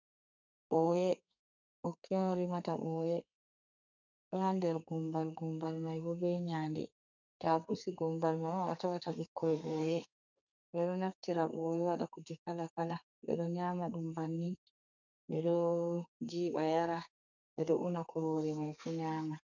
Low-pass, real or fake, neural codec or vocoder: 7.2 kHz; fake; codec, 32 kHz, 1.9 kbps, SNAC